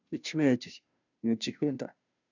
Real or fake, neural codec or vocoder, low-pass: fake; codec, 16 kHz, 0.5 kbps, FunCodec, trained on Chinese and English, 25 frames a second; 7.2 kHz